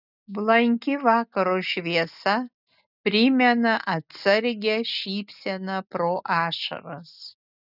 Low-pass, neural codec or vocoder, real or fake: 5.4 kHz; none; real